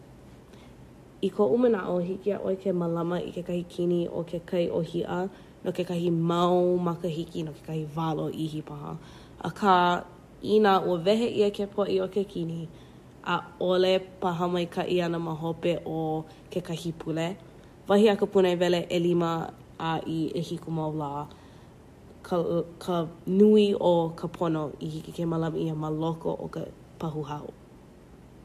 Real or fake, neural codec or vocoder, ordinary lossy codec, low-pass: real; none; MP3, 64 kbps; 14.4 kHz